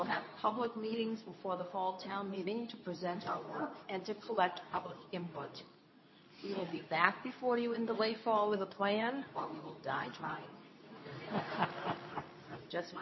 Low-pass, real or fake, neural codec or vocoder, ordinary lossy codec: 7.2 kHz; fake; codec, 24 kHz, 0.9 kbps, WavTokenizer, medium speech release version 2; MP3, 24 kbps